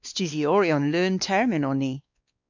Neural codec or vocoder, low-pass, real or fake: codec, 16 kHz, 2 kbps, X-Codec, WavLM features, trained on Multilingual LibriSpeech; 7.2 kHz; fake